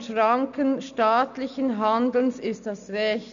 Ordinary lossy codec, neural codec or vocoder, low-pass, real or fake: none; none; 7.2 kHz; real